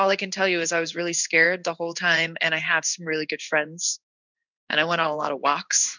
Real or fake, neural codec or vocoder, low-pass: fake; codec, 16 kHz in and 24 kHz out, 1 kbps, XY-Tokenizer; 7.2 kHz